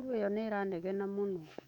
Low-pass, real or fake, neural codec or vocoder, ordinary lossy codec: 19.8 kHz; fake; autoencoder, 48 kHz, 128 numbers a frame, DAC-VAE, trained on Japanese speech; none